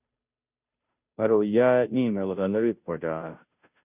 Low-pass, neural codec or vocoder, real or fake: 3.6 kHz; codec, 16 kHz, 0.5 kbps, FunCodec, trained on Chinese and English, 25 frames a second; fake